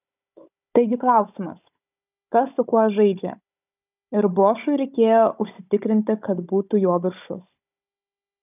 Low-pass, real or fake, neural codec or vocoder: 3.6 kHz; fake; codec, 16 kHz, 16 kbps, FunCodec, trained on Chinese and English, 50 frames a second